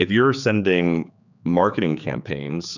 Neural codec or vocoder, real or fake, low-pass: codec, 16 kHz, 4 kbps, X-Codec, HuBERT features, trained on general audio; fake; 7.2 kHz